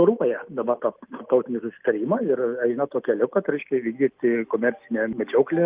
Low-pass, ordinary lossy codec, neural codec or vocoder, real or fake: 3.6 kHz; Opus, 32 kbps; none; real